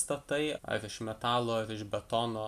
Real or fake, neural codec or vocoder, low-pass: real; none; 14.4 kHz